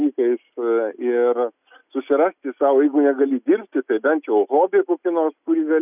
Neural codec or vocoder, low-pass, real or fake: none; 3.6 kHz; real